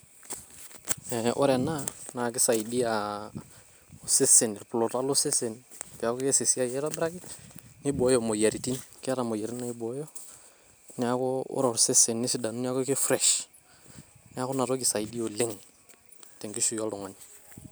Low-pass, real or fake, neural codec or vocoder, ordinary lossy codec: none; real; none; none